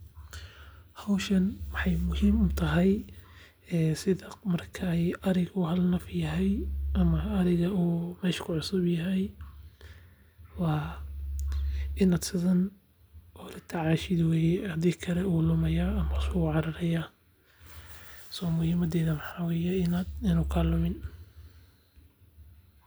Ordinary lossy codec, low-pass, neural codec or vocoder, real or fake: none; none; none; real